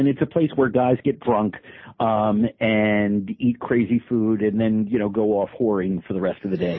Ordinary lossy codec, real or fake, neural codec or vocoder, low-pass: MP3, 24 kbps; real; none; 7.2 kHz